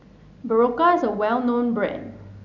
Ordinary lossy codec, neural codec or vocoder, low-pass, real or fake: none; none; 7.2 kHz; real